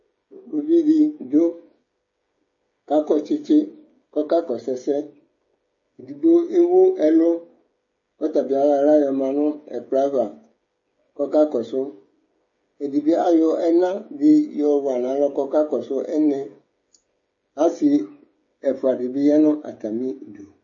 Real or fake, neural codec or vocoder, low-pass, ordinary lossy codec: fake; codec, 16 kHz, 16 kbps, FreqCodec, smaller model; 7.2 kHz; MP3, 32 kbps